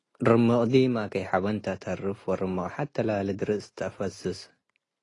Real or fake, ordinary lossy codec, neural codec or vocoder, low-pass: real; AAC, 32 kbps; none; 10.8 kHz